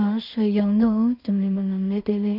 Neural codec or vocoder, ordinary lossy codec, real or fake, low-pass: codec, 16 kHz in and 24 kHz out, 0.4 kbps, LongCat-Audio-Codec, two codebook decoder; none; fake; 5.4 kHz